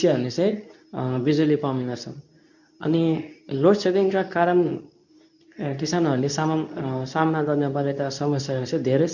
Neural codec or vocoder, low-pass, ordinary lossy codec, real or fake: codec, 24 kHz, 0.9 kbps, WavTokenizer, medium speech release version 2; 7.2 kHz; none; fake